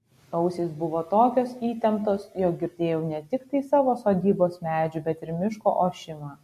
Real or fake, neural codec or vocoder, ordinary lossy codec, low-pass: real; none; MP3, 64 kbps; 14.4 kHz